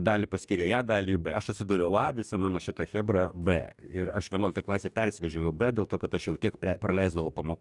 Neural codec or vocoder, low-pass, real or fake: codec, 44.1 kHz, 2.6 kbps, DAC; 10.8 kHz; fake